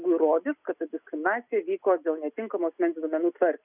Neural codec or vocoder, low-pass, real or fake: none; 3.6 kHz; real